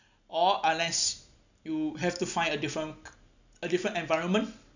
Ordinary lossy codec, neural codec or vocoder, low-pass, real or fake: none; none; 7.2 kHz; real